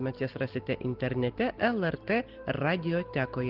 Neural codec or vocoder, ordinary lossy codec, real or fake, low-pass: none; Opus, 16 kbps; real; 5.4 kHz